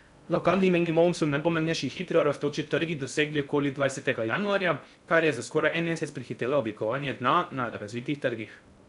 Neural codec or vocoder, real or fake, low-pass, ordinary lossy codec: codec, 16 kHz in and 24 kHz out, 0.6 kbps, FocalCodec, streaming, 2048 codes; fake; 10.8 kHz; none